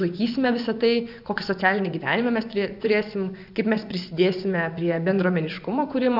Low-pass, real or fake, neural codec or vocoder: 5.4 kHz; fake; vocoder, 24 kHz, 100 mel bands, Vocos